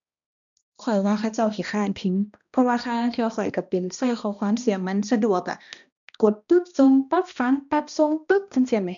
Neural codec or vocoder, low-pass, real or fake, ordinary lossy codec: codec, 16 kHz, 1 kbps, X-Codec, HuBERT features, trained on balanced general audio; 7.2 kHz; fake; MP3, 96 kbps